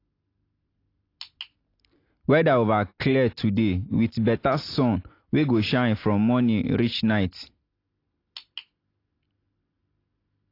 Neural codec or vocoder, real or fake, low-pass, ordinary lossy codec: none; real; 5.4 kHz; AAC, 32 kbps